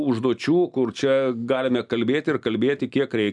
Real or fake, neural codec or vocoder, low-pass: real; none; 10.8 kHz